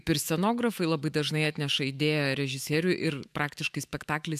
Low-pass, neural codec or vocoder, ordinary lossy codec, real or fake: 14.4 kHz; autoencoder, 48 kHz, 128 numbers a frame, DAC-VAE, trained on Japanese speech; AAC, 96 kbps; fake